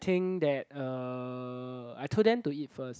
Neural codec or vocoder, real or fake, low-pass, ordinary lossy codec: none; real; none; none